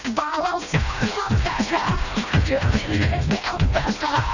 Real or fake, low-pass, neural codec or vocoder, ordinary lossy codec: fake; 7.2 kHz; codec, 16 kHz, 1 kbps, FreqCodec, smaller model; none